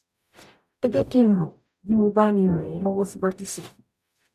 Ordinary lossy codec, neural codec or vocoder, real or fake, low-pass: none; codec, 44.1 kHz, 0.9 kbps, DAC; fake; 14.4 kHz